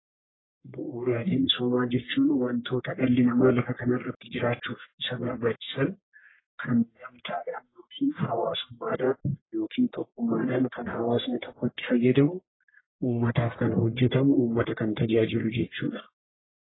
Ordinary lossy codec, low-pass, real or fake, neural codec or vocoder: AAC, 16 kbps; 7.2 kHz; fake; codec, 44.1 kHz, 1.7 kbps, Pupu-Codec